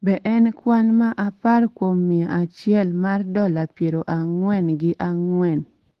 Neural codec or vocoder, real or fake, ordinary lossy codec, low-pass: autoencoder, 48 kHz, 128 numbers a frame, DAC-VAE, trained on Japanese speech; fake; Opus, 16 kbps; 14.4 kHz